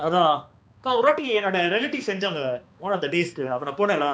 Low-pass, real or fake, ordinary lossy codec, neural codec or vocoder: none; fake; none; codec, 16 kHz, 2 kbps, X-Codec, HuBERT features, trained on balanced general audio